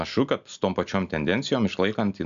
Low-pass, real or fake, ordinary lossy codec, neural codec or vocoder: 7.2 kHz; real; AAC, 96 kbps; none